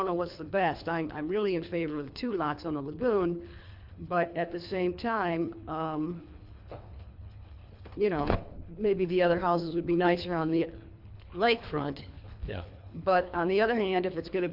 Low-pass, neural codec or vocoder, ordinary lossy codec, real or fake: 5.4 kHz; codec, 24 kHz, 3 kbps, HILCodec; MP3, 48 kbps; fake